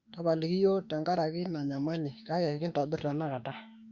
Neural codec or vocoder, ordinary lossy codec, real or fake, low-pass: autoencoder, 48 kHz, 32 numbers a frame, DAC-VAE, trained on Japanese speech; Opus, 64 kbps; fake; 7.2 kHz